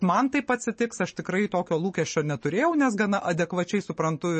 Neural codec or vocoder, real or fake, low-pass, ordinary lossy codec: none; real; 10.8 kHz; MP3, 32 kbps